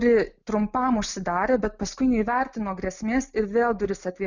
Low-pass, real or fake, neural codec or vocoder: 7.2 kHz; real; none